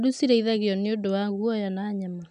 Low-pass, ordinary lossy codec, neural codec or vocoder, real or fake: 9.9 kHz; none; none; real